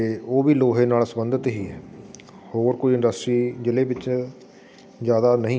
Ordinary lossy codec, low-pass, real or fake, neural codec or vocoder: none; none; real; none